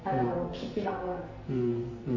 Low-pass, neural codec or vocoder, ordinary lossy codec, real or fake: 7.2 kHz; codec, 44.1 kHz, 2.6 kbps, SNAC; MP3, 32 kbps; fake